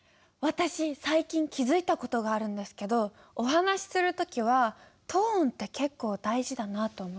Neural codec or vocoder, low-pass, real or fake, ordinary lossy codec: none; none; real; none